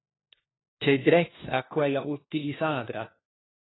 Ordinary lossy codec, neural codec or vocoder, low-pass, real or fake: AAC, 16 kbps; codec, 16 kHz, 1 kbps, FunCodec, trained on LibriTTS, 50 frames a second; 7.2 kHz; fake